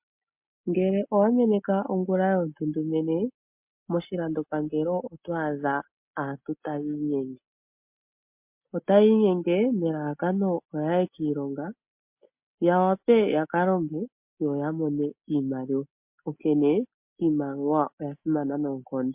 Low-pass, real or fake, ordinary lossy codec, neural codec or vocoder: 3.6 kHz; real; MP3, 32 kbps; none